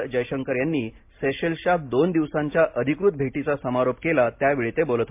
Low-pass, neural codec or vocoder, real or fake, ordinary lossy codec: 3.6 kHz; none; real; MP3, 32 kbps